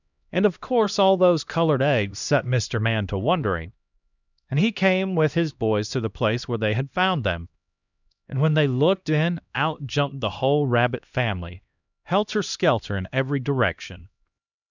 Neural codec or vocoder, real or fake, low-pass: codec, 16 kHz, 1 kbps, X-Codec, HuBERT features, trained on LibriSpeech; fake; 7.2 kHz